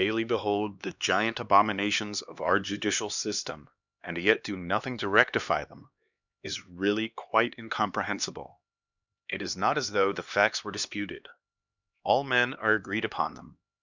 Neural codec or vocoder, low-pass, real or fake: codec, 16 kHz, 2 kbps, X-Codec, HuBERT features, trained on LibriSpeech; 7.2 kHz; fake